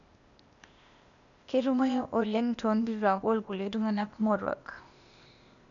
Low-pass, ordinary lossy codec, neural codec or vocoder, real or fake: 7.2 kHz; none; codec, 16 kHz, 0.8 kbps, ZipCodec; fake